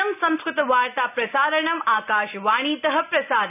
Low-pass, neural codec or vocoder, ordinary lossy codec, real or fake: 3.6 kHz; none; none; real